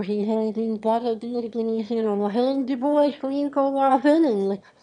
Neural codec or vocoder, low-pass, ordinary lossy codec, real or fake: autoencoder, 22.05 kHz, a latent of 192 numbers a frame, VITS, trained on one speaker; 9.9 kHz; none; fake